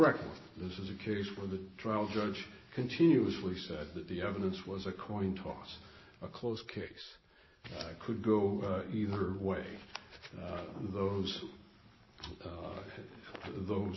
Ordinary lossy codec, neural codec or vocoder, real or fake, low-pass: MP3, 24 kbps; none; real; 7.2 kHz